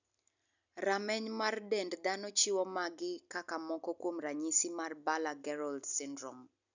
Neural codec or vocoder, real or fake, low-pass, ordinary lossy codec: none; real; 7.2 kHz; none